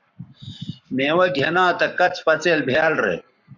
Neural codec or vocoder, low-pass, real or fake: codec, 44.1 kHz, 7.8 kbps, Pupu-Codec; 7.2 kHz; fake